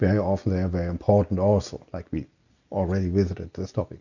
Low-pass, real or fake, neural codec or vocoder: 7.2 kHz; real; none